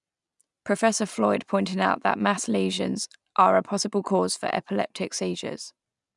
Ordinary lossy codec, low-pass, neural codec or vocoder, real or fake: none; 10.8 kHz; none; real